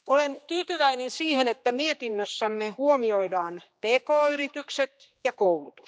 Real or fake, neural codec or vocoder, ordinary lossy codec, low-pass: fake; codec, 16 kHz, 2 kbps, X-Codec, HuBERT features, trained on general audio; none; none